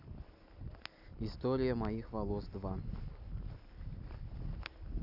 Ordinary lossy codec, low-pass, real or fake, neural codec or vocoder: none; 5.4 kHz; real; none